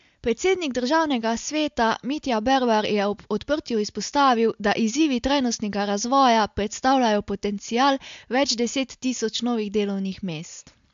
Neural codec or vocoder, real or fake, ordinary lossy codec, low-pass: none; real; MP3, 64 kbps; 7.2 kHz